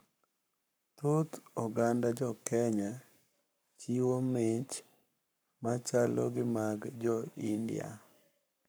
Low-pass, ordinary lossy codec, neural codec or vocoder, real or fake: none; none; codec, 44.1 kHz, 7.8 kbps, Pupu-Codec; fake